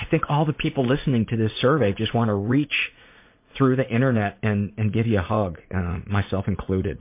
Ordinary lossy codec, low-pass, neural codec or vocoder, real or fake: MP3, 24 kbps; 3.6 kHz; vocoder, 44.1 kHz, 80 mel bands, Vocos; fake